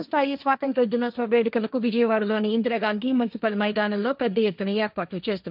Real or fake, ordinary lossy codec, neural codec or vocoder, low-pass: fake; none; codec, 16 kHz, 1.1 kbps, Voila-Tokenizer; 5.4 kHz